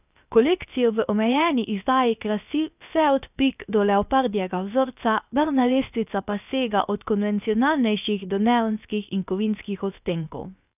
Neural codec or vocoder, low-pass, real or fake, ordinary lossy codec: codec, 16 kHz, about 1 kbps, DyCAST, with the encoder's durations; 3.6 kHz; fake; none